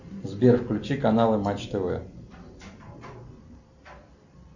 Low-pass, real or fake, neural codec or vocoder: 7.2 kHz; real; none